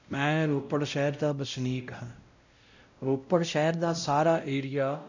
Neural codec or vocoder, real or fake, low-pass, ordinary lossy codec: codec, 16 kHz, 0.5 kbps, X-Codec, WavLM features, trained on Multilingual LibriSpeech; fake; 7.2 kHz; none